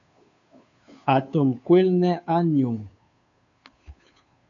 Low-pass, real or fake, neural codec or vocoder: 7.2 kHz; fake; codec, 16 kHz, 2 kbps, FunCodec, trained on Chinese and English, 25 frames a second